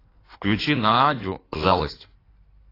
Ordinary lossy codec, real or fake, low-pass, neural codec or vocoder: AAC, 24 kbps; fake; 5.4 kHz; codec, 16 kHz in and 24 kHz out, 1.1 kbps, FireRedTTS-2 codec